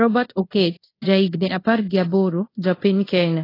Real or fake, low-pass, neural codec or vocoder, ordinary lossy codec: fake; 5.4 kHz; codec, 24 kHz, 0.5 kbps, DualCodec; AAC, 24 kbps